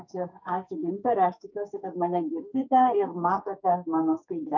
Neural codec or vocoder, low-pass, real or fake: codec, 44.1 kHz, 2.6 kbps, SNAC; 7.2 kHz; fake